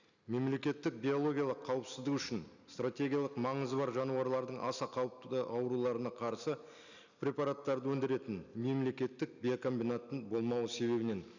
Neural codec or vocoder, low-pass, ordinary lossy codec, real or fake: none; 7.2 kHz; none; real